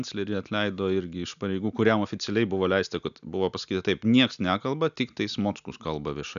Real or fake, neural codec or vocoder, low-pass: real; none; 7.2 kHz